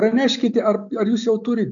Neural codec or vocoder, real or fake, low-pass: none; real; 7.2 kHz